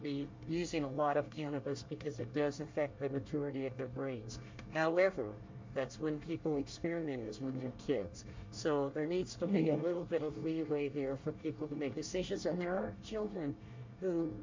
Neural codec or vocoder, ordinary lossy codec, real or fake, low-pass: codec, 24 kHz, 1 kbps, SNAC; MP3, 48 kbps; fake; 7.2 kHz